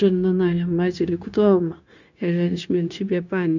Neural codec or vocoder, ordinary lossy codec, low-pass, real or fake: codec, 16 kHz, 0.9 kbps, LongCat-Audio-Codec; none; 7.2 kHz; fake